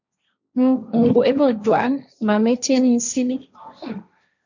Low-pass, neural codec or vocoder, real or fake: 7.2 kHz; codec, 16 kHz, 1.1 kbps, Voila-Tokenizer; fake